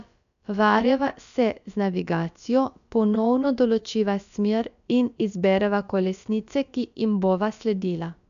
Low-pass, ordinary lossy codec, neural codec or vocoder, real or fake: 7.2 kHz; none; codec, 16 kHz, about 1 kbps, DyCAST, with the encoder's durations; fake